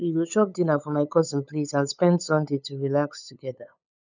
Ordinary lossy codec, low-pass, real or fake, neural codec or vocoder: none; 7.2 kHz; fake; codec, 16 kHz, 8 kbps, FunCodec, trained on LibriTTS, 25 frames a second